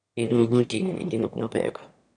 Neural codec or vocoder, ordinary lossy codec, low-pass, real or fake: autoencoder, 22.05 kHz, a latent of 192 numbers a frame, VITS, trained on one speaker; none; 9.9 kHz; fake